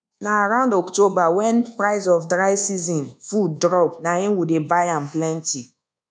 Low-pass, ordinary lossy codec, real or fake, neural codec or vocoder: 9.9 kHz; none; fake; codec, 24 kHz, 1.2 kbps, DualCodec